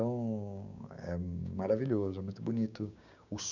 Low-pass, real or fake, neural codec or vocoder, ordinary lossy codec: 7.2 kHz; fake; codec, 16 kHz, 6 kbps, DAC; none